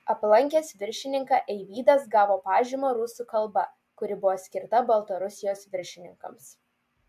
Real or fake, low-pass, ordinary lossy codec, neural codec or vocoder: real; 14.4 kHz; MP3, 96 kbps; none